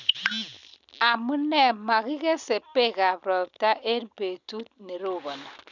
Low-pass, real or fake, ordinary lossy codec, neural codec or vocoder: 7.2 kHz; real; none; none